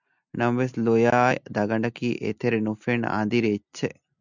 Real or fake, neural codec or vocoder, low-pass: real; none; 7.2 kHz